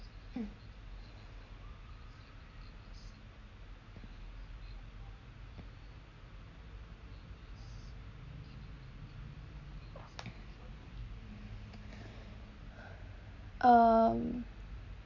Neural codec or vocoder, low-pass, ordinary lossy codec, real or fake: none; 7.2 kHz; none; real